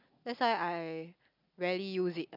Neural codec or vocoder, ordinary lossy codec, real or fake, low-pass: none; none; real; 5.4 kHz